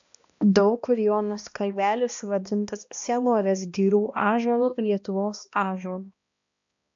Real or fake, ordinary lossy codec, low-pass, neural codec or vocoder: fake; AAC, 64 kbps; 7.2 kHz; codec, 16 kHz, 1 kbps, X-Codec, HuBERT features, trained on balanced general audio